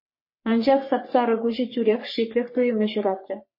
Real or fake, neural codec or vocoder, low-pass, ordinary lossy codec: fake; codec, 44.1 kHz, 3.4 kbps, Pupu-Codec; 5.4 kHz; MP3, 24 kbps